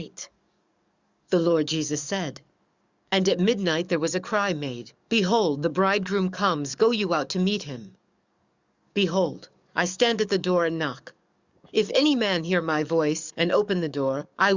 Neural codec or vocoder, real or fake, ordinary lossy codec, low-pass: codec, 44.1 kHz, 7.8 kbps, DAC; fake; Opus, 64 kbps; 7.2 kHz